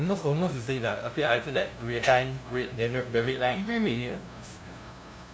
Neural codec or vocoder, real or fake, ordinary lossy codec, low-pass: codec, 16 kHz, 0.5 kbps, FunCodec, trained on LibriTTS, 25 frames a second; fake; none; none